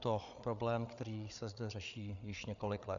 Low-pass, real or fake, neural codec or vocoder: 7.2 kHz; fake; codec, 16 kHz, 8 kbps, FreqCodec, larger model